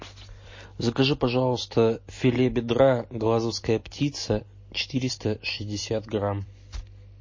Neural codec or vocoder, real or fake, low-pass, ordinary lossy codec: none; real; 7.2 kHz; MP3, 32 kbps